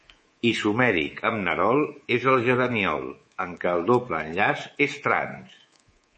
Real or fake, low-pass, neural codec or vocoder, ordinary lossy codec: fake; 10.8 kHz; codec, 24 kHz, 3.1 kbps, DualCodec; MP3, 32 kbps